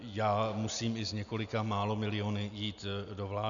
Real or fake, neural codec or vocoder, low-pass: real; none; 7.2 kHz